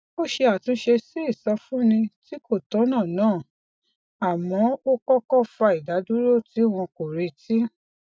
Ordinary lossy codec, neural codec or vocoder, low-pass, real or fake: none; none; none; real